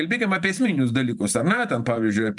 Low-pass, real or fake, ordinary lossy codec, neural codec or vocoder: 10.8 kHz; fake; MP3, 96 kbps; vocoder, 44.1 kHz, 128 mel bands every 512 samples, BigVGAN v2